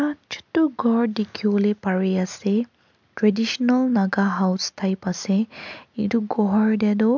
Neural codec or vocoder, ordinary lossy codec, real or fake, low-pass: none; MP3, 64 kbps; real; 7.2 kHz